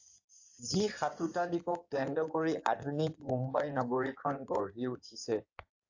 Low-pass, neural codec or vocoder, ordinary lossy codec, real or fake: 7.2 kHz; codec, 16 kHz in and 24 kHz out, 2.2 kbps, FireRedTTS-2 codec; AAC, 48 kbps; fake